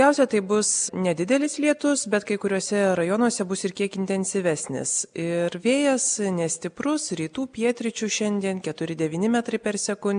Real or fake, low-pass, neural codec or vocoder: real; 9.9 kHz; none